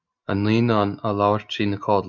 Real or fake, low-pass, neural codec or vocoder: real; 7.2 kHz; none